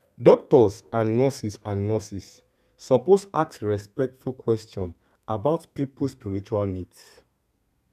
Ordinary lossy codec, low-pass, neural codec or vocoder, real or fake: none; 14.4 kHz; codec, 32 kHz, 1.9 kbps, SNAC; fake